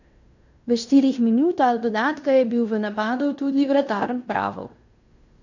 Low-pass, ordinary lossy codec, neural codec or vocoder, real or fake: 7.2 kHz; none; codec, 16 kHz in and 24 kHz out, 0.9 kbps, LongCat-Audio-Codec, fine tuned four codebook decoder; fake